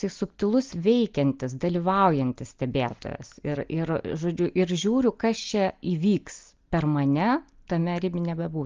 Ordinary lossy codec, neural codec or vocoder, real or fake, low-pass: Opus, 32 kbps; none; real; 7.2 kHz